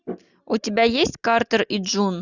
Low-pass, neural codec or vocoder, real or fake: 7.2 kHz; none; real